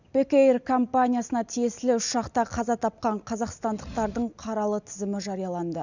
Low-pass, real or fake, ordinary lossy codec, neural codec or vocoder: 7.2 kHz; real; none; none